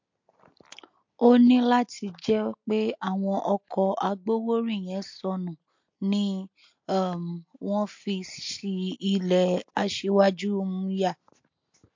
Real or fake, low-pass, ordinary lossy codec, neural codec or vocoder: real; 7.2 kHz; MP3, 48 kbps; none